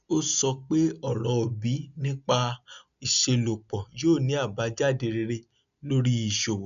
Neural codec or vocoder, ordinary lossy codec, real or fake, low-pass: none; none; real; 7.2 kHz